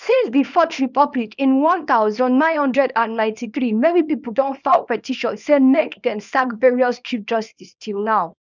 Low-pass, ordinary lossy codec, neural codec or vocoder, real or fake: 7.2 kHz; none; codec, 24 kHz, 0.9 kbps, WavTokenizer, small release; fake